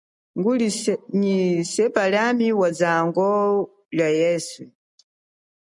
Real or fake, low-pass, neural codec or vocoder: real; 10.8 kHz; none